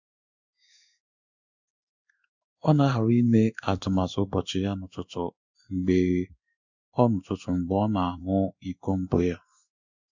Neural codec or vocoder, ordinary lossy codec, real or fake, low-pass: codec, 16 kHz in and 24 kHz out, 1 kbps, XY-Tokenizer; Opus, 64 kbps; fake; 7.2 kHz